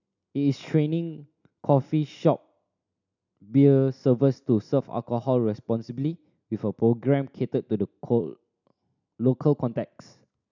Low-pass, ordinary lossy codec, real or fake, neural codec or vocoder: 7.2 kHz; none; real; none